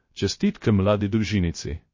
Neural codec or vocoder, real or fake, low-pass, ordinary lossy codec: codec, 16 kHz, 0.3 kbps, FocalCodec; fake; 7.2 kHz; MP3, 32 kbps